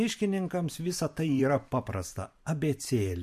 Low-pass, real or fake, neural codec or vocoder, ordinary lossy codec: 14.4 kHz; fake; vocoder, 44.1 kHz, 128 mel bands every 512 samples, BigVGAN v2; MP3, 64 kbps